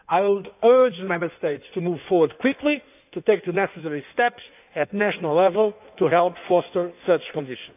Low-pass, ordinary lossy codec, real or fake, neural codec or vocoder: 3.6 kHz; none; fake; codec, 16 kHz in and 24 kHz out, 1.1 kbps, FireRedTTS-2 codec